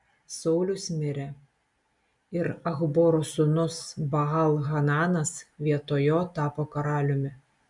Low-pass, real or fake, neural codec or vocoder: 10.8 kHz; real; none